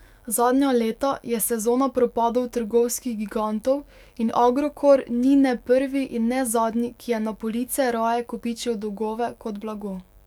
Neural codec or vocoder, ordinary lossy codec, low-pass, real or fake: autoencoder, 48 kHz, 128 numbers a frame, DAC-VAE, trained on Japanese speech; none; 19.8 kHz; fake